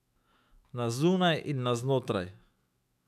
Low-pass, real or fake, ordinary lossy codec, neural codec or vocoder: 14.4 kHz; fake; none; autoencoder, 48 kHz, 128 numbers a frame, DAC-VAE, trained on Japanese speech